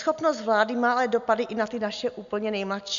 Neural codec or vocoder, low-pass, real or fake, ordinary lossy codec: none; 7.2 kHz; real; MP3, 64 kbps